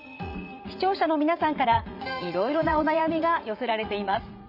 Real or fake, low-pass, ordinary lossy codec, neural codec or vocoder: fake; 5.4 kHz; none; vocoder, 44.1 kHz, 80 mel bands, Vocos